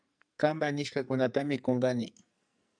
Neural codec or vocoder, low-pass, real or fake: codec, 44.1 kHz, 2.6 kbps, SNAC; 9.9 kHz; fake